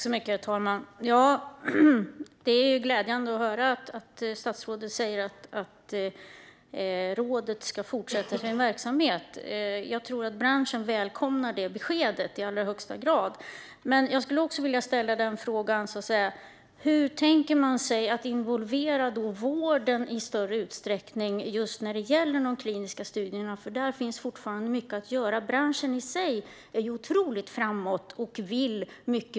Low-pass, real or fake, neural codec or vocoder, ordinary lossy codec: none; real; none; none